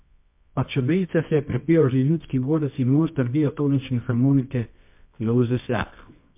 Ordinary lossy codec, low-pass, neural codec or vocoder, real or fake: MP3, 32 kbps; 3.6 kHz; codec, 24 kHz, 0.9 kbps, WavTokenizer, medium music audio release; fake